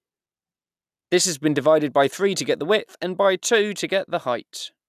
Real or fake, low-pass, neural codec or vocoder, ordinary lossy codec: real; 14.4 kHz; none; none